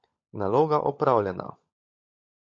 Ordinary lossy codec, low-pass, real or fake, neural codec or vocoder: MP3, 48 kbps; 7.2 kHz; fake; codec, 16 kHz, 16 kbps, FunCodec, trained on LibriTTS, 50 frames a second